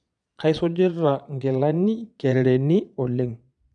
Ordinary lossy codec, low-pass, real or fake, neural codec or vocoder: none; 9.9 kHz; fake; vocoder, 22.05 kHz, 80 mel bands, Vocos